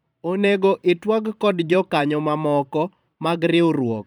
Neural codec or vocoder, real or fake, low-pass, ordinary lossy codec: vocoder, 44.1 kHz, 128 mel bands every 512 samples, BigVGAN v2; fake; 19.8 kHz; none